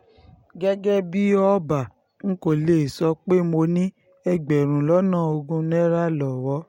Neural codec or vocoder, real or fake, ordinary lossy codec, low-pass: none; real; MP3, 64 kbps; 19.8 kHz